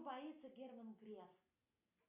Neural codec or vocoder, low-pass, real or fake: none; 3.6 kHz; real